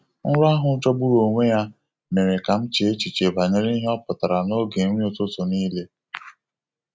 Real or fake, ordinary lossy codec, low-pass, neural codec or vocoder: real; none; none; none